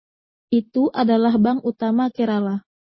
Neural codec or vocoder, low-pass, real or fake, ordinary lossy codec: none; 7.2 kHz; real; MP3, 24 kbps